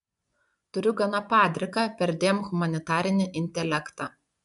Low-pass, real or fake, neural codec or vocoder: 10.8 kHz; fake; vocoder, 24 kHz, 100 mel bands, Vocos